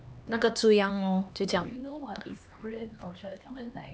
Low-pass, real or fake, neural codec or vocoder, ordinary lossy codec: none; fake; codec, 16 kHz, 2 kbps, X-Codec, HuBERT features, trained on LibriSpeech; none